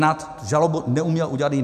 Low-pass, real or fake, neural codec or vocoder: 14.4 kHz; real; none